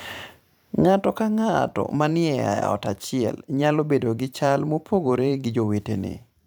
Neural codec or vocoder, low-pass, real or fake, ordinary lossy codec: vocoder, 44.1 kHz, 128 mel bands every 512 samples, BigVGAN v2; none; fake; none